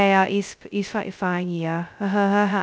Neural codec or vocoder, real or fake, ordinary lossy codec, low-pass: codec, 16 kHz, 0.2 kbps, FocalCodec; fake; none; none